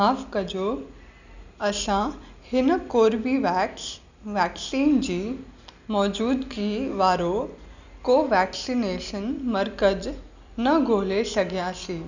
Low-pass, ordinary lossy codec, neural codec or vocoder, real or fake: 7.2 kHz; none; none; real